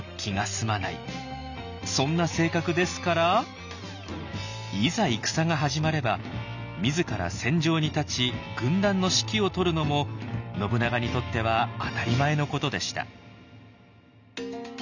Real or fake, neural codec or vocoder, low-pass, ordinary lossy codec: real; none; 7.2 kHz; none